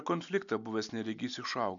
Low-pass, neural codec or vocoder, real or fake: 7.2 kHz; none; real